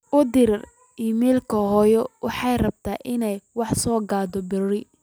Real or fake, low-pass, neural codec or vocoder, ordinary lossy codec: real; none; none; none